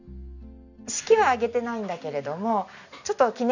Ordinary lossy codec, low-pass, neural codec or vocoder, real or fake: none; 7.2 kHz; none; real